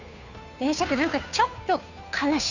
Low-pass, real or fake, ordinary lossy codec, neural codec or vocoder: 7.2 kHz; fake; none; codec, 16 kHz, 2 kbps, FunCodec, trained on Chinese and English, 25 frames a second